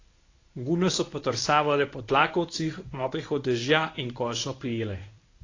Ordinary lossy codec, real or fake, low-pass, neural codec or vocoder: AAC, 32 kbps; fake; 7.2 kHz; codec, 24 kHz, 0.9 kbps, WavTokenizer, medium speech release version 1